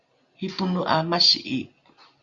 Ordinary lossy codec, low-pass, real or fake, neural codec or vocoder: Opus, 64 kbps; 7.2 kHz; real; none